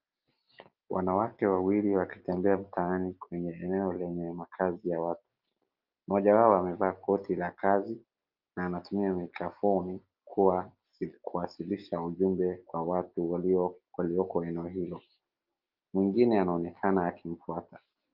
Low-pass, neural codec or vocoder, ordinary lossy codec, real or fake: 5.4 kHz; none; Opus, 32 kbps; real